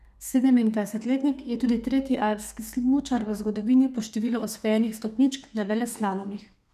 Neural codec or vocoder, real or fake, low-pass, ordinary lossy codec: codec, 32 kHz, 1.9 kbps, SNAC; fake; 14.4 kHz; none